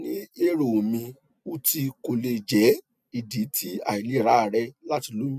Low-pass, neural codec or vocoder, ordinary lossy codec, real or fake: 14.4 kHz; none; none; real